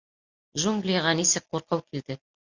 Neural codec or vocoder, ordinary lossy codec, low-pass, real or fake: none; Opus, 64 kbps; 7.2 kHz; real